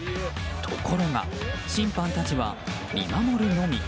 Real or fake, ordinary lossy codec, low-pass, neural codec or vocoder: real; none; none; none